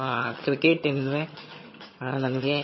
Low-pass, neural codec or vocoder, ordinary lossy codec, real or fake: 7.2 kHz; vocoder, 22.05 kHz, 80 mel bands, HiFi-GAN; MP3, 24 kbps; fake